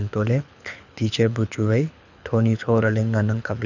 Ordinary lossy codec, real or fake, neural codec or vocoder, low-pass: none; fake; codec, 24 kHz, 6 kbps, HILCodec; 7.2 kHz